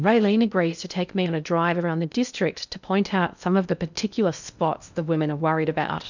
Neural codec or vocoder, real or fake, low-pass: codec, 16 kHz in and 24 kHz out, 0.6 kbps, FocalCodec, streaming, 2048 codes; fake; 7.2 kHz